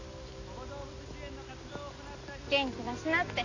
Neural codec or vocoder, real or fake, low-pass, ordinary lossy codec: none; real; 7.2 kHz; none